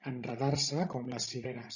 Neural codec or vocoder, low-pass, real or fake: codec, 16 kHz, 16 kbps, FreqCodec, larger model; 7.2 kHz; fake